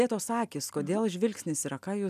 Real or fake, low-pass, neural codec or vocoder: real; 14.4 kHz; none